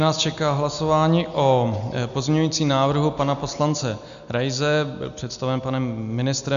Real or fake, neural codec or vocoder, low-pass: real; none; 7.2 kHz